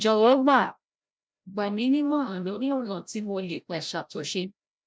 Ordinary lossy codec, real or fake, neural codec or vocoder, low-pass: none; fake; codec, 16 kHz, 0.5 kbps, FreqCodec, larger model; none